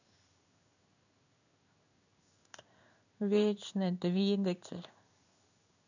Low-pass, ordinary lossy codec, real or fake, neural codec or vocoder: 7.2 kHz; none; fake; codec, 16 kHz in and 24 kHz out, 1 kbps, XY-Tokenizer